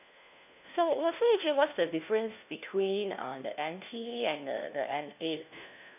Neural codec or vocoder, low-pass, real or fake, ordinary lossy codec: codec, 16 kHz, 1 kbps, FunCodec, trained on LibriTTS, 50 frames a second; 3.6 kHz; fake; none